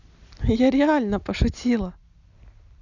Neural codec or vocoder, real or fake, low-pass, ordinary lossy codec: none; real; 7.2 kHz; none